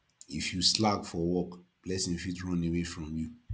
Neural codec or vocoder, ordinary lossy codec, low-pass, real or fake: none; none; none; real